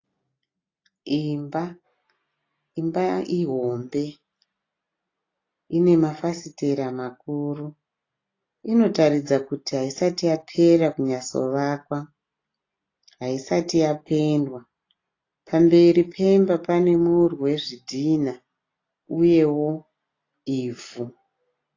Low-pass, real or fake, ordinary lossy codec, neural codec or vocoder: 7.2 kHz; real; AAC, 32 kbps; none